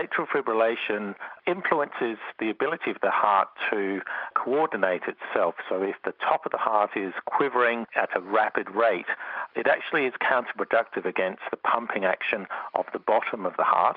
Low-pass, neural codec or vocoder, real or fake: 5.4 kHz; none; real